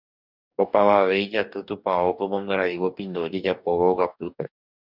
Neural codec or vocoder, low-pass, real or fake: codec, 44.1 kHz, 2.6 kbps, DAC; 5.4 kHz; fake